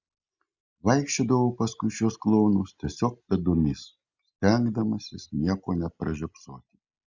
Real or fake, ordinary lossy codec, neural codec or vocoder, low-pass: real; Opus, 64 kbps; none; 7.2 kHz